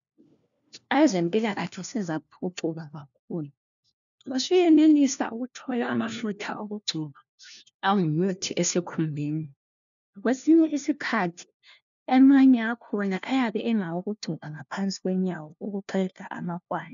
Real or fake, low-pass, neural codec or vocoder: fake; 7.2 kHz; codec, 16 kHz, 1 kbps, FunCodec, trained on LibriTTS, 50 frames a second